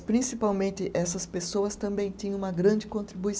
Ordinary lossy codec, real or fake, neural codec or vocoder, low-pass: none; real; none; none